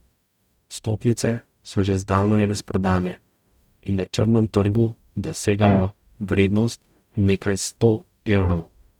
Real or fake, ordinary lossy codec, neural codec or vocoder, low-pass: fake; none; codec, 44.1 kHz, 0.9 kbps, DAC; 19.8 kHz